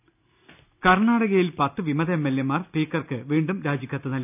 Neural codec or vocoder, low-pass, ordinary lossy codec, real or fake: none; 3.6 kHz; none; real